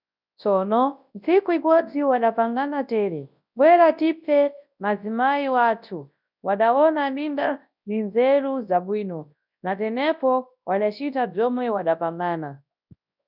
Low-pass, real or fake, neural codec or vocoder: 5.4 kHz; fake; codec, 24 kHz, 0.9 kbps, WavTokenizer, large speech release